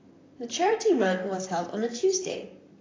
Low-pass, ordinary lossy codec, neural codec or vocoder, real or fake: 7.2 kHz; AAC, 32 kbps; vocoder, 44.1 kHz, 128 mel bands, Pupu-Vocoder; fake